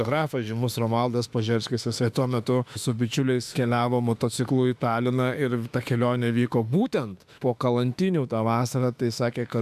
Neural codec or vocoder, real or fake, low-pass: autoencoder, 48 kHz, 32 numbers a frame, DAC-VAE, trained on Japanese speech; fake; 14.4 kHz